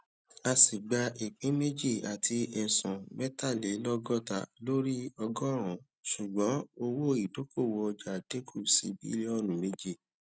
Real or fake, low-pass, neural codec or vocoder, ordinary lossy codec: real; none; none; none